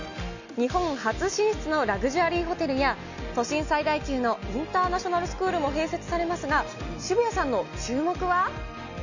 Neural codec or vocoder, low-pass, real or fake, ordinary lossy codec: none; 7.2 kHz; real; none